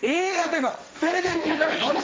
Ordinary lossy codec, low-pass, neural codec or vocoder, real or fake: none; none; codec, 16 kHz, 1.1 kbps, Voila-Tokenizer; fake